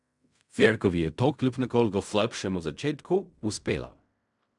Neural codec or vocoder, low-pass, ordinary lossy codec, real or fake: codec, 16 kHz in and 24 kHz out, 0.4 kbps, LongCat-Audio-Codec, fine tuned four codebook decoder; 10.8 kHz; none; fake